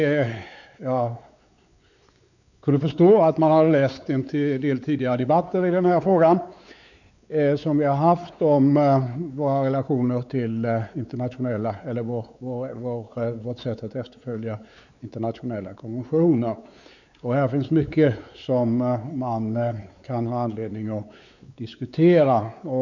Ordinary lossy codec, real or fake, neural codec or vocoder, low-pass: none; fake; codec, 16 kHz, 4 kbps, X-Codec, WavLM features, trained on Multilingual LibriSpeech; 7.2 kHz